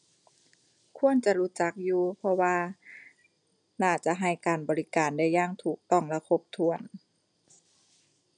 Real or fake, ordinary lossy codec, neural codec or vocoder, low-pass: real; none; none; 9.9 kHz